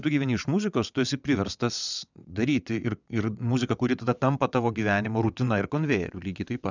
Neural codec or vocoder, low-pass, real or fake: vocoder, 22.05 kHz, 80 mel bands, WaveNeXt; 7.2 kHz; fake